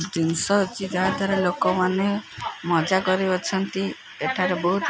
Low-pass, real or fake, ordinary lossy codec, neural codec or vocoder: none; real; none; none